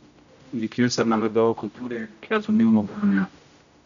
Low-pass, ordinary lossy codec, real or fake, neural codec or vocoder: 7.2 kHz; none; fake; codec, 16 kHz, 0.5 kbps, X-Codec, HuBERT features, trained on general audio